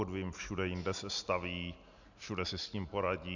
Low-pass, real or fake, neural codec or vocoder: 7.2 kHz; real; none